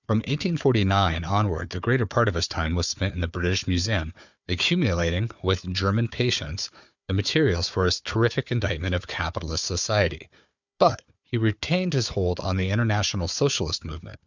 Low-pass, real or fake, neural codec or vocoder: 7.2 kHz; fake; codec, 16 kHz, 4 kbps, FunCodec, trained on Chinese and English, 50 frames a second